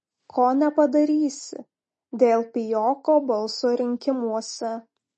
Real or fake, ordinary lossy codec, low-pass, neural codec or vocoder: real; MP3, 32 kbps; 10.8 kHz; none